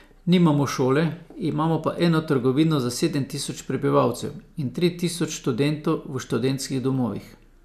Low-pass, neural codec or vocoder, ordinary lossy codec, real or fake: 14.4 kHz; none; none; real